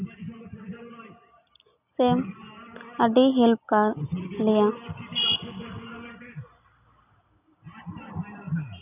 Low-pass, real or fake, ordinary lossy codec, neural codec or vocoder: 3.6 kHz; real; none; none